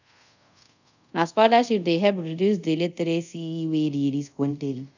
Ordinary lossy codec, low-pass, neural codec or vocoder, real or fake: none; 7.2 kHz; codec, 24 kHz, 0.5 kbps, DualCodec; fake